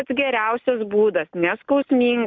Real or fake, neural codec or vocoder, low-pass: real; none; 7.2 kHz